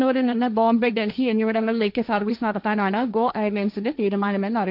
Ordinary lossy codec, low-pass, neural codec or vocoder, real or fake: none; 5.4 kHz; codec, 16 kHz, 1.1 kbps, Voila-Tokenizer; fake